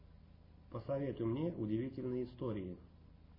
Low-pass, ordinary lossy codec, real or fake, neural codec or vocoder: 5.4 kHz; MP3, 24 kbps; real; none